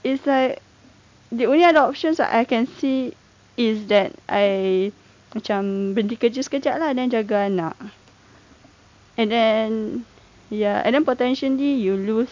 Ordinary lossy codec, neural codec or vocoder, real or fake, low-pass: MP3, 64 kbps; none; real; 7.2 kHz